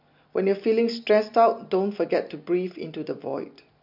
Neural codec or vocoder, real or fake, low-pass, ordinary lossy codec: none; real; 5.4 kHz; MP3, 48 kbps